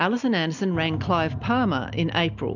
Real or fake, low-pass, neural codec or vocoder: real; 7.2 kHz; none